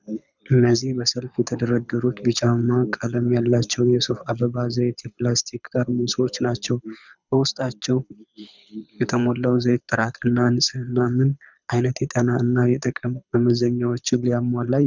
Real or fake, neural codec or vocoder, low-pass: fake; codec, 24 kHz, 6 kbps, HILCodec; 7.2 kHz